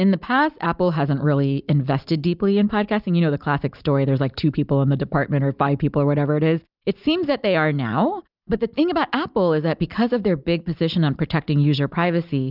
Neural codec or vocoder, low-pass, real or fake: none; 5.4 kHz; real